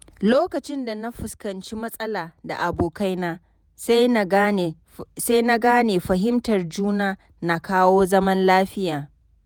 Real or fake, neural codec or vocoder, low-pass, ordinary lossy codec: fake; vocoder, 48 kHz, 128 mel bands, Vocos; none; none